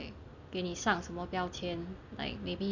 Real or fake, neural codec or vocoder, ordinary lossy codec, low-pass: real; none; none; 7.2 kHz